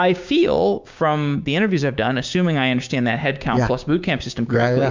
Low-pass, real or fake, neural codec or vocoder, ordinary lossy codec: 7.2 kHz; fake; codec, 16 kHz, 6 kbps, DAC; MP3, 64 kbps